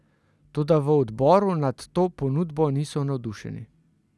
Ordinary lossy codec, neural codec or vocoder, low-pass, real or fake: none; none; none; real